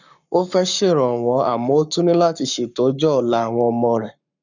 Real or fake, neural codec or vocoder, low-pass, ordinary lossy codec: fake; codec, 44.1 kHz, 7.8 kbps, Pupu-Codec; 7.2 kHz; none